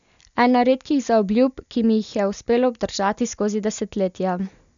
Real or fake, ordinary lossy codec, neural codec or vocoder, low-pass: real; none; none; 7.2 kHz